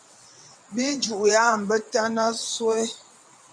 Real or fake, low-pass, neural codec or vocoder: fake; 9.9 kHz; vocoder, 22.05 kHz, 80 mel bands, WaveNeXt